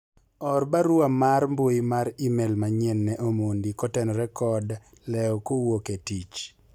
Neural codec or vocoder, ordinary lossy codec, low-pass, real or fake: none; none; 19.8 kHz; real